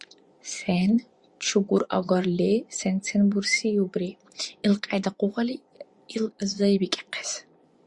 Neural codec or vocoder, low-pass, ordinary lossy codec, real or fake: vocoder, 24 kHz, 100 mel bands, Vocos; 10.8 kHz; Opus, 64 kbps; fake